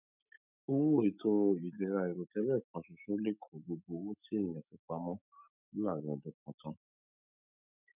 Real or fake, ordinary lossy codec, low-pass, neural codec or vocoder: fake; none; 3.6 kHz; autoencoder, 48 kHz, 128 numbers a frame, DAC-VAE, trained on Japanese speech